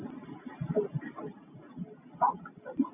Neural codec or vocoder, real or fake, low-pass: none; real; 3.6 kHz